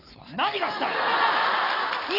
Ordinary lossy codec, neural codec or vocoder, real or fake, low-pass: none; none; real; 5.4 kHz